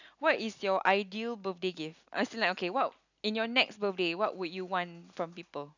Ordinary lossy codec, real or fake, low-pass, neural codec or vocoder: none; real; 7.2 kHz; none